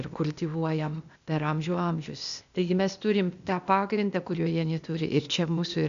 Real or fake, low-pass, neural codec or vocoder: fake; 7.2 kHz; codec, 16 kHz, 0.8 kbps, ZipCodec